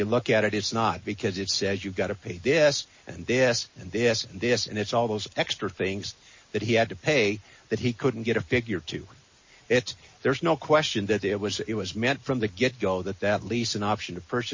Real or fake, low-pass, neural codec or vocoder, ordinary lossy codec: real; 7.2 kHz; none; MP3, 32 kbps